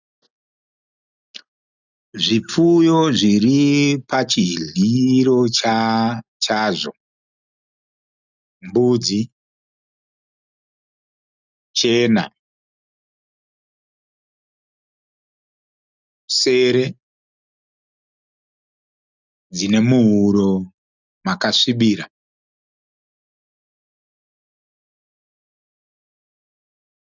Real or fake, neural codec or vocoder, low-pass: real; none; 7.2 kHz